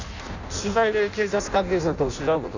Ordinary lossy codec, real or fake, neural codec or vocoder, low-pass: none; fake; codec, 16 kHz in and 24 kHz out, 0.6 kbps, FireRedTTS-2 codec; 7.2 kHz